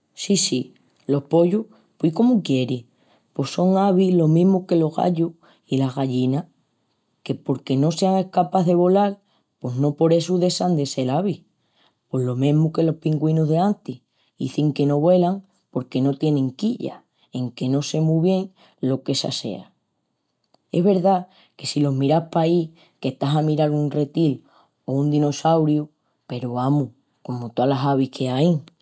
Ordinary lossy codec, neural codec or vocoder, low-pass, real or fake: none; none; none; real